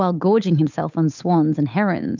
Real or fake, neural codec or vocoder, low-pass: fake; codec, 16 kHz, 8 kbps, FunCodec, trained on Chinese and English, 25 frames a second; 7.2 kHz